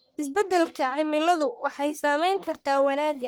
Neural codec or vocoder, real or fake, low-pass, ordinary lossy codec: codec, 44.1 kHz, 1.7 kbps, Pupu-Codec; fake; none; none